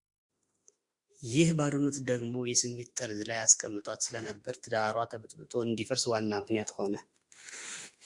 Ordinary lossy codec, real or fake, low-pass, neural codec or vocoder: Opus, 64 kbps; fake; 10.8 kHz; autoencoder, 48 kHz, 32 numbers a frame, DAC-VAE, trained on Japanese speech